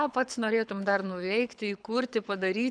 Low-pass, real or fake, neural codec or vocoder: 9.9 kHz; fake; codec, 24 kHz, 6 kbps, HILCodec